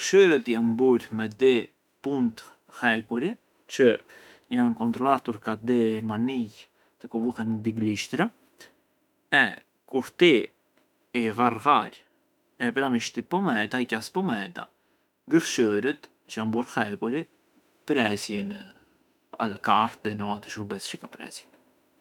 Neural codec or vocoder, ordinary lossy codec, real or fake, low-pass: autoencoder, 48 kHz, 32 numbers a frame, DAC-VAE, trained on Japanese speech; none; fake; 19.8 kHz